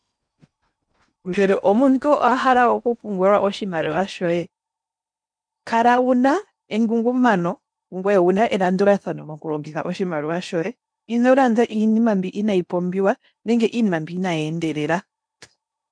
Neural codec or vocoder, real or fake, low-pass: codec, 16 kHz in and 24 kHz out, 0.8 kbps, FocalCodec, streaming, 65536 codes; fake; 9.9 kHz